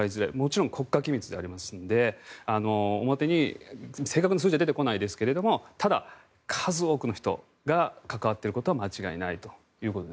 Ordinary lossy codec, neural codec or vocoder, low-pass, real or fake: none; none; none; real